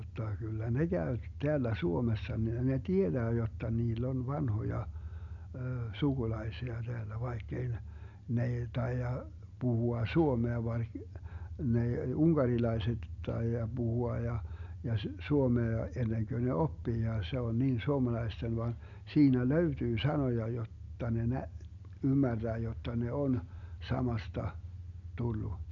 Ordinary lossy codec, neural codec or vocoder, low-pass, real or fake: none; none; 7.2 kHz; real